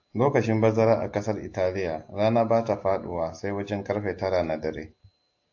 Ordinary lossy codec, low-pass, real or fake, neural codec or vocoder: AAC, 48 kbps; 7.2 kHz; real; none